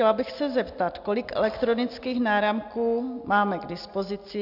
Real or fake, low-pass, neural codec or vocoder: real; 5.4 kHz; none